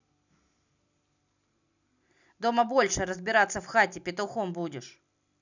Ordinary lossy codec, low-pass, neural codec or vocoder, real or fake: none; 7.2 kHz; none; real